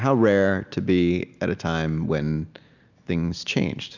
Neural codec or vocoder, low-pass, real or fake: none; 7.2 kHz; real